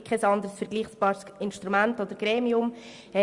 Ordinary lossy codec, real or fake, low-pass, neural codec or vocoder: Opus, 64 kbps; real; 10.8 kHz; none